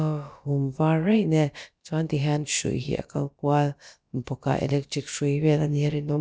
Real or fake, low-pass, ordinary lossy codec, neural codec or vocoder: fake; none; none; codec, 16 kHz, about 1 kbps, DyCAST, with the encoder's durations